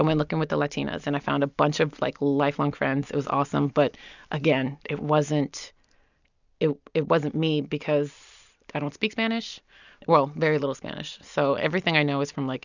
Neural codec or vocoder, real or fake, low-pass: none; real; 7.2 kHz